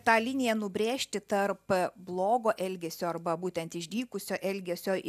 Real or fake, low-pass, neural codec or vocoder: real; 14.4 kHz; none